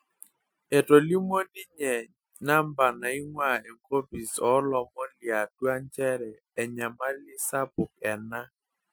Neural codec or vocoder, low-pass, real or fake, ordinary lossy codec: none; none; real; none